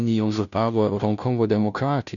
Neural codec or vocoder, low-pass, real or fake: codec, 16 kHz, 0.5 kbps, FunCodec, trained on Chinese and English, 25 frames a second; 7.2 kHz; fake